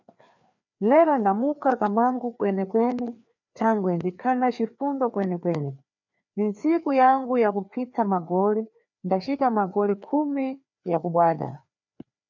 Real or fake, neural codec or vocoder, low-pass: fake; codec, 16 kHz, 2 kbps, FreqCodec, larger model; 7.2 kHz